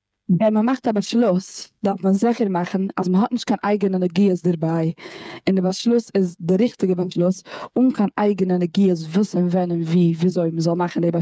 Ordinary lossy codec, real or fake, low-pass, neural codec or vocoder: none; fake; none; codec, 16 kHz, 8 kbps, FreqCodec, smaller model